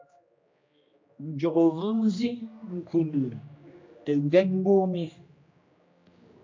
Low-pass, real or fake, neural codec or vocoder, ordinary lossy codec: 7.2 kHz; fake; codec, 16 kHz, 1 kbps, X-Codec, HuBERT features, trained on general audio; MP3, 48 kbps